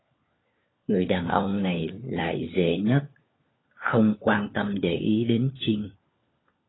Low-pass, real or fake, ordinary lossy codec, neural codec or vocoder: 7.2 kHz; fake; AAC, 16 kbps; codec, 16 kHz, 4 kbps, FunCodec, trained on LibriTTS, 50 frames a second